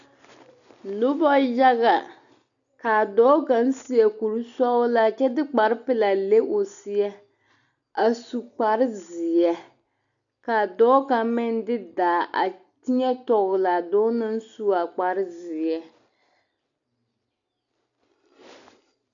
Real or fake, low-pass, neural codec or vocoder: real; 7.2 kHz; none